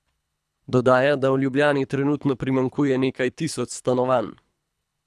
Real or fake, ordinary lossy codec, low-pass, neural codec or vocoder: fake; none; none; codec, 24 kHz, 3 kbps, HILCodec